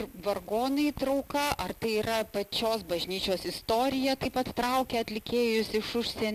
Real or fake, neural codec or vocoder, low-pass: real; none; 14.4 kHz